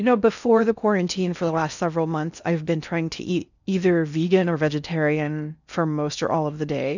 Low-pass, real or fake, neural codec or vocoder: 7.2 kHz; fake; codec, 16 kHz in and 24 kHz out, 0.6 kbps, FocalCodec, streaming, 4096 codes